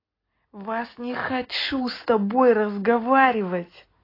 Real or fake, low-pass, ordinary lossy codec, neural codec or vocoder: real; 5.4 kHz; AAC, 24 kbps; none